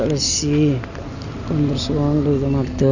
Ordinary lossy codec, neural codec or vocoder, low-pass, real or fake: none; none; 7.2 kHz; real